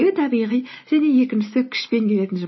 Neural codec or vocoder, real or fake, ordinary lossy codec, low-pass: none; real; MP3, 24 kbps; 7.2 kHz